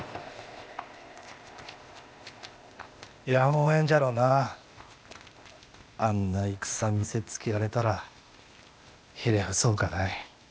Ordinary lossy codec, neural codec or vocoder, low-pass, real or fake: none; codec, 16 kHz, 0.8 kbps, ZipCodec; none; fake